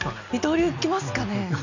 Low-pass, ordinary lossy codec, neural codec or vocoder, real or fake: 7.2 kHz; none; none; real